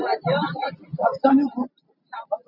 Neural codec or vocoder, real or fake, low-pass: none; real; 5.4 kHz